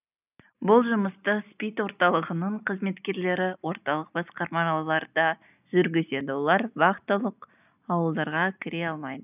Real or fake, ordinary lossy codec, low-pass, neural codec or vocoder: real; none; 3.6 kHz; none